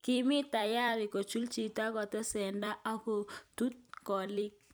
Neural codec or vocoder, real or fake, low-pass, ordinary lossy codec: vocoder, 44.1 kHz, 128 mel bands every 256 samples, BigVGAN v2; fake; none; none